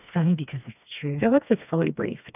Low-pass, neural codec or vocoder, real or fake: 3.6 kHz; codec, 16 kHz, 2 kbps, FreqCodec, smaller model; fake